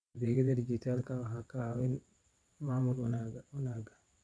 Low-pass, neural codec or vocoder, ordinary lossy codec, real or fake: none; vocoder, 22.05 kHz, 80 mel bands, WaveNeXt; none; fake